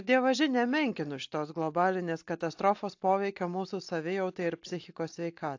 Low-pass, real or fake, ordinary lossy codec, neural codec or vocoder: 7.2 kHz; real; AAC, 48 kbps; none